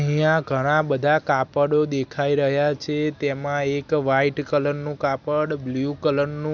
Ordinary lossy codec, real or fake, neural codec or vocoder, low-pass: none; real; none; 7.2 kHz